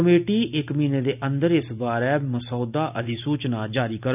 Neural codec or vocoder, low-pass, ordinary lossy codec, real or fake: none; 3.6 kHz; none; real